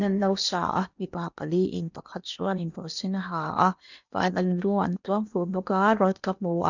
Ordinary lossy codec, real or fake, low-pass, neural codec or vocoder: none; fake; 7.2 kHz; codec, 16 kHz in and 24 kHz out, 0.8 kbps, FocalCodec, streaming, 65536 codes